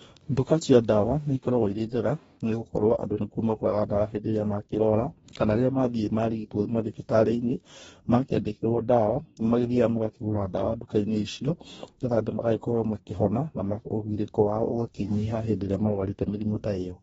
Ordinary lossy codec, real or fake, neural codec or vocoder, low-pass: AAC, 24 kbps; fake; codec, 44.1 kHz, 2.6 kbps, DAC; 19.8 kHz